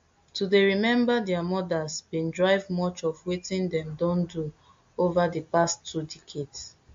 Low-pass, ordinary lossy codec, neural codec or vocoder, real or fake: 7.2 kHz; MP3, 48 kbps; none; real